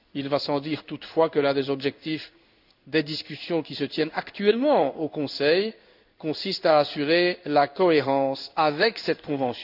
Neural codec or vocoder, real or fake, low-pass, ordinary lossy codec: codec, 16 kHz in and 24 kHz out, 1 kbps, XY-Tokenizer; fake; 5.4 kHz; none